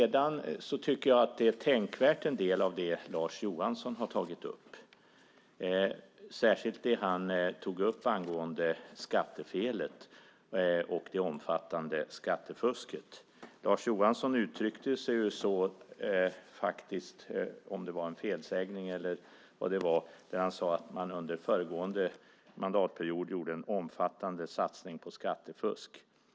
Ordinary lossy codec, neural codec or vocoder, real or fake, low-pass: none; none; real; none